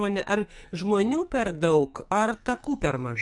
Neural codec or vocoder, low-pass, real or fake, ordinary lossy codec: codec, 44.1 kHz, 2.6 kbps, SNAC; 10.8 kHz; fake; MP3, 64 kbps